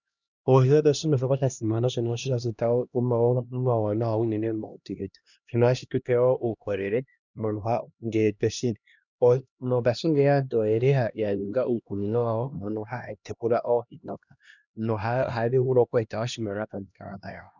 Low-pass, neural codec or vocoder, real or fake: 7.2 kHz; codec, 16 kHz, 1 kbps, X-Codec, HuBERT features, trained on LibriSpeech; fake